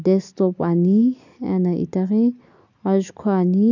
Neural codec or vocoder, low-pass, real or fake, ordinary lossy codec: none; 7.2 kHz; real; none